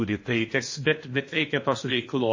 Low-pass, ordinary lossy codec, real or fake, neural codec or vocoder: 7.2 kHz; MP3, 32 kbps; fake; codec, 16 kHz in and 24 kHz out, 0.8 kbps, FocalCodec, streaming, 65536 codes